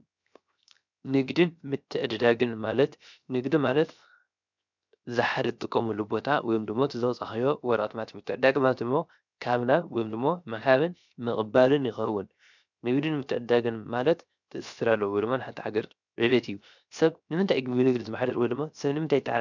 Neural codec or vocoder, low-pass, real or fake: codec, 16 kHz, 0.7 kbps, FocalCodec; 7.2 kHz; fake